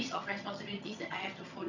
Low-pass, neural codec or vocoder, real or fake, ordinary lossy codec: 7.2 kHz; vocoder, 22.05 kHz, 80 mel bands, HiFi-GAN; fake; none